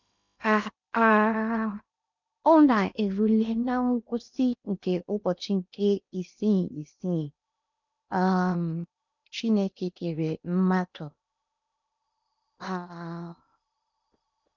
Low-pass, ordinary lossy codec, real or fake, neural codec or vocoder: 7.2 kHz; none; fake; codec, 16 kHz in and 24 kHz out, 0.8 kbps, FocalCodec, streaming, 65536 codes